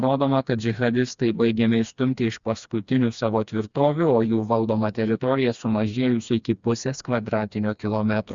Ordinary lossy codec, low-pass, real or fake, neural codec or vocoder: Opus, 64 kbps; 7.2 kHz; fake; codec, 16 kHz, 2 kbps, FreqCodec, smaller model